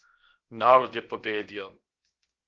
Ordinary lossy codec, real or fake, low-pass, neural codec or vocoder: Opus, 16 kbps; fake; 7.2 kHz; codec, 16 kHz, 0.7 kbps, FocalCodec